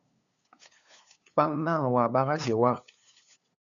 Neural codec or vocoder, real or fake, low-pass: codec, 16 kHz, 2 kbps, FunCodec, trained on LibriTTS, 25 frames a second; fake; 7.2 kHz